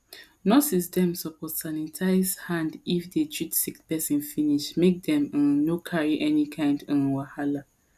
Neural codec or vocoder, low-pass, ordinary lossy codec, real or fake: none; 14.4 kHz; none; real